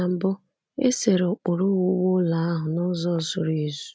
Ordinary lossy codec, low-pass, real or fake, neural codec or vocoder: none; none; real; none